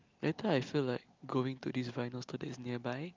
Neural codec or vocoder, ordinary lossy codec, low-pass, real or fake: none; Opus, 24 kbps; 7.2 kHz; real